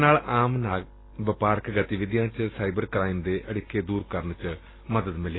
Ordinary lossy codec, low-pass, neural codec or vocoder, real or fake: AAC, 16 kbps; 7.2 kHz; none; real